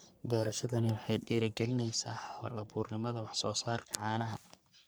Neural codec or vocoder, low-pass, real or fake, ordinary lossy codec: codec, 44.1 kHz, 3.4 kbps, Pupu-Codec; none; fake; none